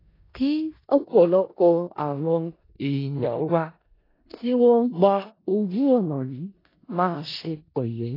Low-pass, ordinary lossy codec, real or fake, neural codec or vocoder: 5.4 kHz; AAC, 24 kbps; fake; codec, 16 kHz in and 24 kHz out, 0.4 kbps, LongCat-Audio-Codec, four codebook decoder